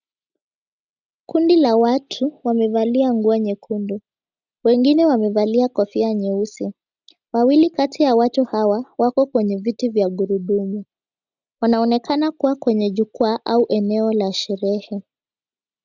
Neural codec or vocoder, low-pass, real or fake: none; 7.2 kHz; real